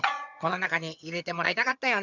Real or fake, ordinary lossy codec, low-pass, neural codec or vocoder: fake; none; 7.2 kHz; vocoder, 22.05 kHz, 80 mel bands, HiFi-GAN